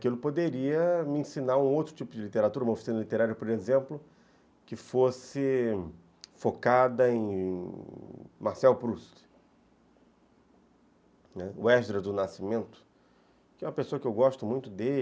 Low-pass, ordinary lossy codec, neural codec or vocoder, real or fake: none; none; none; real